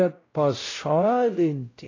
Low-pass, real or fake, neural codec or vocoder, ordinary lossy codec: 7.2 kHz; fake; codec, 16 kHz, 0.5 kbps, X-Codec, WavLM features, trained on Multilingual LibriSpeech; AAC, 32 kbps